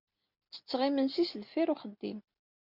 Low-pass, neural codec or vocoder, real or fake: 5.4 kHz; none; real